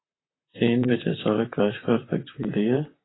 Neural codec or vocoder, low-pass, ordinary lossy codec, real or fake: vocoder, 44.1 kHz, 128 mel bands, Pupu-Vocoder; 7.2 kHz; AAC, 16 kbps; fake